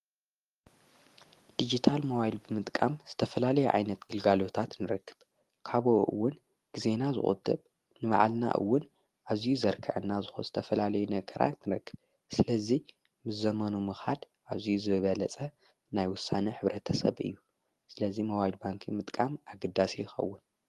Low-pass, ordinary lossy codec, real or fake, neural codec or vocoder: 14.4 kHz; Opus, 24 kbps; real; none